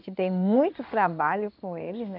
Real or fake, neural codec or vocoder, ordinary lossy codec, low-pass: fake; codec, 16 kHz in and 24 kHz out, 1 kbps, XY-Tokenizer; none; 5.4 kHz